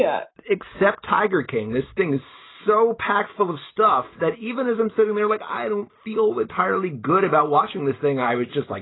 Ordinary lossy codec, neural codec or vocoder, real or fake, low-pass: AAC, 16 kbps; none; real; 7.2 kHz